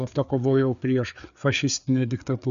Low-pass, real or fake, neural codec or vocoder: 7.2 kHz; fake; codec, 16 kHz, 4 kbps, FreqCodec, larger model